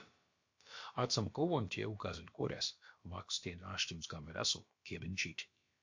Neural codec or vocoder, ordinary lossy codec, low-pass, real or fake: codec, 16 kHz, about 1 kbps, DyCAST, with the encoder's durations; MP3, 48 kbps; 7.2 kHz; fake